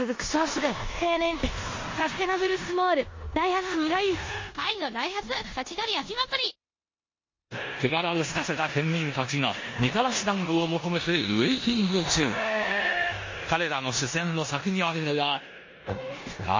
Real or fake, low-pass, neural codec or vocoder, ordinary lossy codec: fake; 7.2 kHz; codec, 16 kHz in and 24 kHz out, 0.9 kbps, LongCat-Audio-Codec, four codebook decoder; MP3, 32 kbps